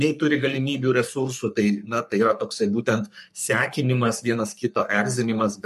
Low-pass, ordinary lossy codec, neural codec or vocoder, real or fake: 14.4 kHz; MP3, 64 kbps; codec, 44.1 kHz, 3.4 kbps, Pupu-Codec; fake